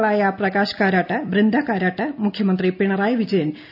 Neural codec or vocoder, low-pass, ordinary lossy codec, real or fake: none; 5.4 kHz; none; real